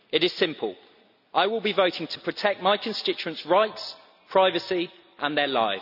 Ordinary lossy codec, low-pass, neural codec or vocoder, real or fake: none; 5.4 kHz; none; real